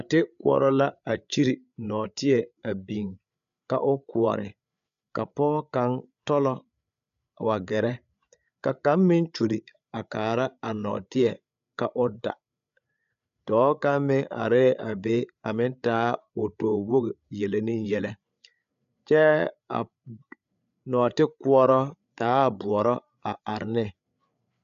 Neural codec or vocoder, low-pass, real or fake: codec, 16 kHz, 8 kbps, FreqCodec, larger model; 7.2 kHz; fake